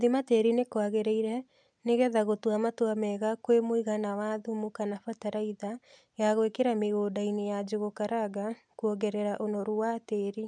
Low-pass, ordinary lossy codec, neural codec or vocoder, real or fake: 9.9 kHz; none; none; real